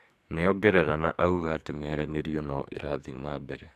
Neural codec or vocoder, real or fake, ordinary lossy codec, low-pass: codec, 44.1 kHz, 2.6 kbps, SNAC; fake; AAC, 96 kbps; 14.4 kHz